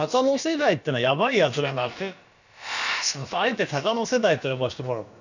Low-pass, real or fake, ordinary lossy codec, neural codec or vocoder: 7.2 kHz; fake; none; codec, 16 kHz, about 1 kbps, DyCAST, with the encoder's durations